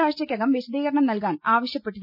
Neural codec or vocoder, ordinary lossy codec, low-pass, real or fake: none; none; 5.4 kHz; real